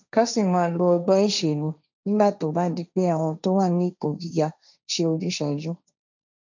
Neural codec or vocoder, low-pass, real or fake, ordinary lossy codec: codec, 16 kHz, 1.1 kbps, Voila-Tokenizer; 7.2 kHz; fake; none